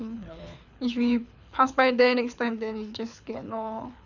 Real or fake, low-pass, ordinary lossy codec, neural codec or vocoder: fake; 7.2 kHz; none; codec, 24 kHz, 6 kbps, HILCodec